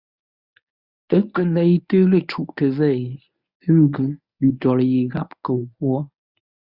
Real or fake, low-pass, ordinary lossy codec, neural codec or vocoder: fake; 5.4 kHz; Opus, 64 kbps; codec, 24 kHz, 0.9 kbps, WavTokenizer, medium speech release version 1